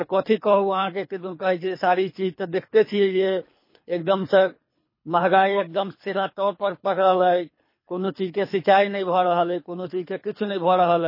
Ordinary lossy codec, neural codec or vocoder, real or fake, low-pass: MP3, 24 kbps; codec, 24 kHz, 3 kbps, HILCodec; fake; 5.4 kHz